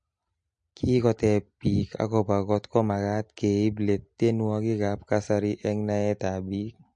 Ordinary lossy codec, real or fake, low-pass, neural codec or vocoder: MP3, 48 kbps; real; 9.9 kHz; none